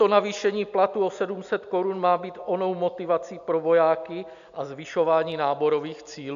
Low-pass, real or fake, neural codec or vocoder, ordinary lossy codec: 7.2 kHz; real; none; AAC, 96 kbps